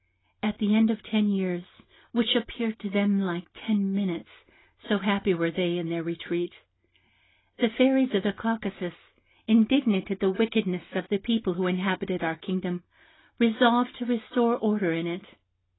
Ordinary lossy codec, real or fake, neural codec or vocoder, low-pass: AAC, 16 kbps; real; none; 7.2 kHz